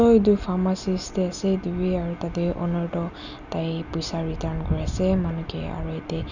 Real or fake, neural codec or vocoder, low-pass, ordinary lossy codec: real; none; 7.2 kHz; none